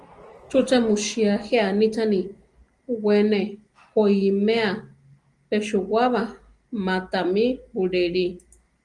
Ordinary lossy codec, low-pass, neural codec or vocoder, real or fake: Opus, 24 kbps; 10.8 kHz; none; real